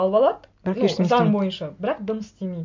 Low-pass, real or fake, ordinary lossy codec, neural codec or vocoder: 7.2 kHz; real; MP3, 48 kbps; none